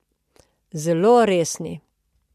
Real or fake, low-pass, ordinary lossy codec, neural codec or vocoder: real; 14.4 kHz; MP3, 64 kbps; none